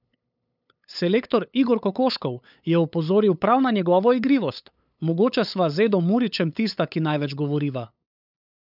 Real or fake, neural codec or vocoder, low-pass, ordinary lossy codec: fake; codec, 16 kHz, 8 kbps, FunCodec, trained on LibriTTS, 25 frames a second; 5.4 kHz; none